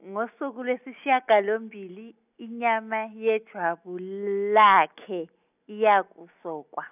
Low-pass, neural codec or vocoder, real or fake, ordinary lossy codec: 3.6 kHz; none; real; none